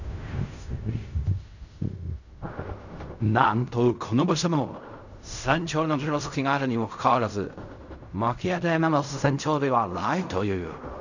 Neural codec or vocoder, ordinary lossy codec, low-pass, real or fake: codec, 16 kHz in and 24 kHz out, 0.4 kbps, LongCat-Audio-Codec, fine tuned four codebook decoder; none; 7.2 kHz; fake